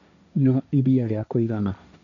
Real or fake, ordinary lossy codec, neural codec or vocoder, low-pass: fake; none; codec, 16 kHz, 1.1 kbps, Voila-Tokenizer; 7.2 kHz